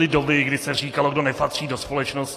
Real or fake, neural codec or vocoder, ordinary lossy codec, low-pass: real; none; AAC, 48 kbps; 14.4 kHz